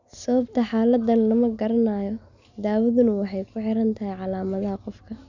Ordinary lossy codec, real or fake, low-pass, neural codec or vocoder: none; real; 7.2 kHz; none